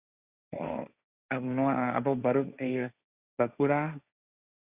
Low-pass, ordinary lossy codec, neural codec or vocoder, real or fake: 3.6 kHz; Opus, 64 kbps; codec, 16 kHz, 1.1 kbps, Voila-Tokenizer; fake